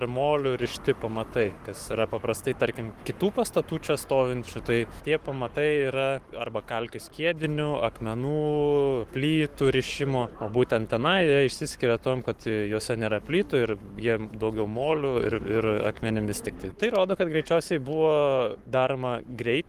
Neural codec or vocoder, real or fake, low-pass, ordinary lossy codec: codec, 44.1 kHz, 7.8 kbps, Pupu-Codec; fake; 14.4 kHz; Opus, 24 kbps